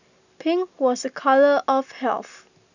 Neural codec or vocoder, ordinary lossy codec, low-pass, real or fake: none; none; 7.2 kHz; real